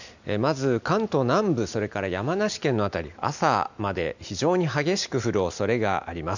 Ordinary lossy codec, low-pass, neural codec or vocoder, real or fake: none; 7.2 kHz; none; real